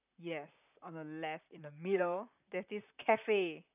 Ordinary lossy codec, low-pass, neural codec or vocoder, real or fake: none; 3.6 kHz; vocoder, 44.1 kHz, 128 mel bands, Pupu-Vocoder; fake